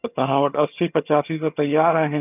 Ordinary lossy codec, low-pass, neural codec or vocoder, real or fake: none; 3.6 kHz; vocoder, 22.05 kHz, 80 mel bands, HiFi-GAN; fake